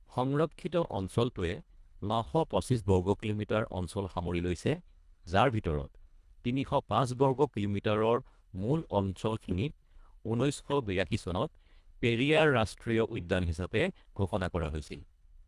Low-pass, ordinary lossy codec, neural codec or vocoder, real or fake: none; none; codec, 24 kHz, 1.5 kbps, HILCodec; fake